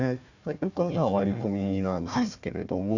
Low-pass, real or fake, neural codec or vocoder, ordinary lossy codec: 7.2 kHz; fake; codec, 16 kHz, 1 kbps, FunCodec, trained on Chinese and English, 50 frames a second; none